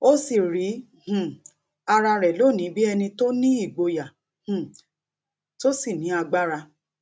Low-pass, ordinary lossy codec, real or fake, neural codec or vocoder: none; none; real; none